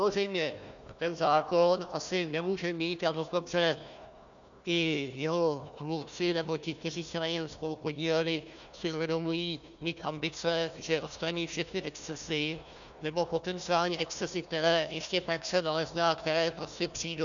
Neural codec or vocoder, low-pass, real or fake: codec, 16 kHz, 1 kbps, FunCodec, trained on Chinese and English, 50 frames a second; 7.2 kHz; fake